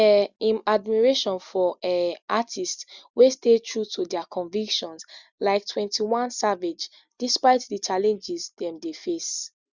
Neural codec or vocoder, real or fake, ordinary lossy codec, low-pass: none; real; Opus, 64 kbps; 7.2 kHz